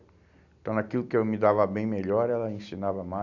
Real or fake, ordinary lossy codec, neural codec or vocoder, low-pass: real; none; none; 7.2 kHz